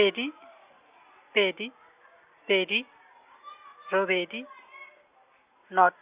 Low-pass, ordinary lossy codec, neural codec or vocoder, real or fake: 3.6 kHz; Opus, 64 kbps; none; real